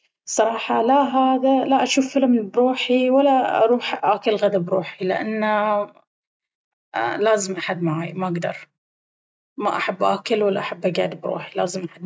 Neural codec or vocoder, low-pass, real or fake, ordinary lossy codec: none; none; real; none